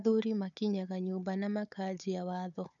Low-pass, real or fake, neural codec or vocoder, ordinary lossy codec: 7.2 kHz; fake; codec, 16 kHz, 4 kbps, FunCodec, trained on Chinese and English, 50 frames a second; none